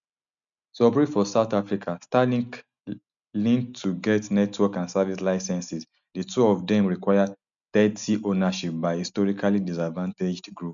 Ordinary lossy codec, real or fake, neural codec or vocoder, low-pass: MP3, 96 kbps; real; none; 7.2 kHz